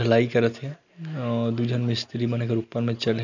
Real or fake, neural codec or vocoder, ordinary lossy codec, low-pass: real; none; none; 7.2 kHz